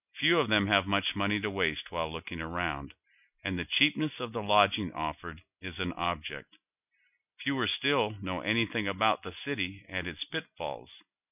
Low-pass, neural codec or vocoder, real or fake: 3.6 kHz; none; real